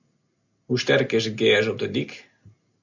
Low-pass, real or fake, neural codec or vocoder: 7.2 kHz; real; none